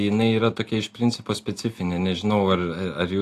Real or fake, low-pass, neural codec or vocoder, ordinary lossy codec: real; 14.4 kHz; none; AAC, 64 kbps